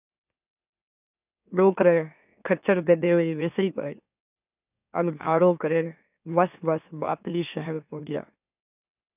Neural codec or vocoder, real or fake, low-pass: autoencoder, 44.1 kHz, a latent of 192 numbers a frame, MeloTTS; fake; 3.6 kHz